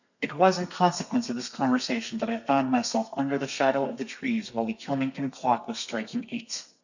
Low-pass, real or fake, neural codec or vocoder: 7.2 kHz; fake; codec, 32 kHz, 1.9 kbps, SNAC